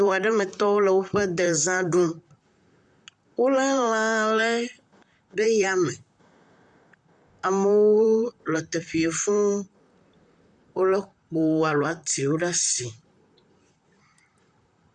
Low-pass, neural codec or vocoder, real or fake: 10.8 kHz; vocoder, 44.1 kHz, 128 mel bands, Pupu-Vocoder; fake